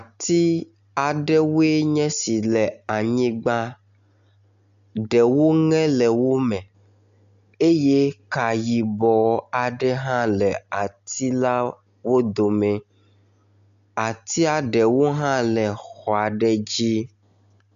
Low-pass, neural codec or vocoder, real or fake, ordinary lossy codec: 7.2 kHz; none; real; AAC, 96 kbps